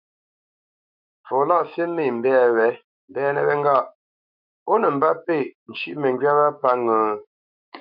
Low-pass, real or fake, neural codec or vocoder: 5.4 kHz; fake; autoencoder, 48 kHz, 128 numbers a frame, DAC-VAE, trained on Japanese speech